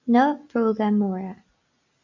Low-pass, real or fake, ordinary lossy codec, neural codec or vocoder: 7.2 kHz; real; AAC, 48 kbps; none